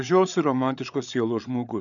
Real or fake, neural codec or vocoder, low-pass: fake; codec, 16 kHz, 8 kbps, FreqCodec, larger model; 7.2 kHz